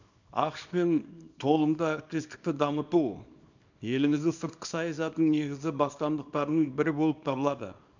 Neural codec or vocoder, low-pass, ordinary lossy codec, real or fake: codec, 24 kHz, 0.9 kbps, WavTokenizer, small release; 7.2 kHz; none; fake